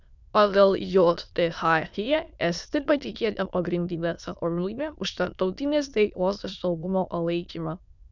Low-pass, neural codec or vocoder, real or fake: 7.2 kHz; autoencoder, 22.05 kHz, a latent of 192 numbers a frame, VITS, trained on many speakers; fake